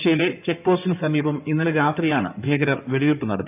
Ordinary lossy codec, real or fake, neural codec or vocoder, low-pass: none; fake; codec, 16 kHz in and 24 kHz out, 2.2 kbps, FireRedTTS-2 codec; 3.6 kHz